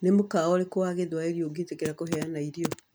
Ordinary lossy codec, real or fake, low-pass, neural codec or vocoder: none; real; none; none